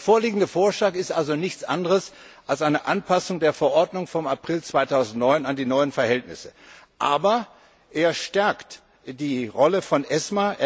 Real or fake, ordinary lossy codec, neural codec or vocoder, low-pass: real; none; none; none